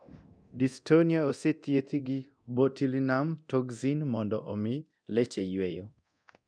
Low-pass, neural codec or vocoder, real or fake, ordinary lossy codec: 9.9 kHz; codec, 24 kHz, 0.9 kbps, DualCodec; fake; none